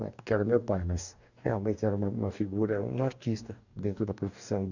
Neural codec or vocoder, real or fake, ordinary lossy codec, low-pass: codec, 44.1 kHz, 2.6 kbps, DAC; fake; none; 7.2 kHz